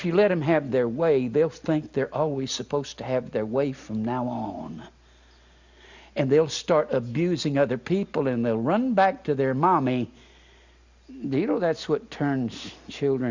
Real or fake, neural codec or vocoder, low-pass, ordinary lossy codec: real; none; 7.2 kHz; Opus, 64 kbps